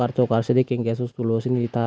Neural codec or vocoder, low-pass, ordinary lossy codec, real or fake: none; none; none; real